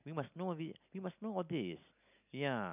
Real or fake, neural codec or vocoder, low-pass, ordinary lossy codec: real; none; 3.6 kHz; none